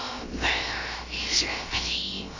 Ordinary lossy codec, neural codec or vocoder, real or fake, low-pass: AAC, 48 kbps; codec, 16 kHz, about 1 kbps, DyCAST, with the encoder's durations; fake; 7.2 kHz